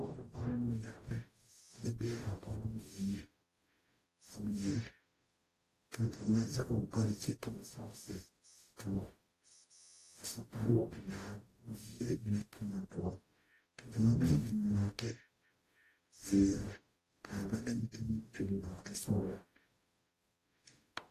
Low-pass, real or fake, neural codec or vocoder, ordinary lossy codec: 14.4 kHz; fake; codec, 44.1 kHz, 0.9 kbps, DAC; AAC, 48 kbps